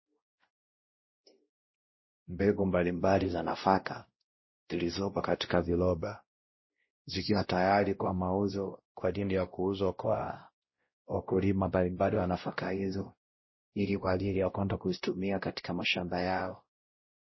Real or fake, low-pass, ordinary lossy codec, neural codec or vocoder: fake; 7.2 kHz; MP3, 24 kbps; codec, 16 kHz, 0.5 kbps, X-Codec, WavLM features, trained on Multilingual LibriSpeech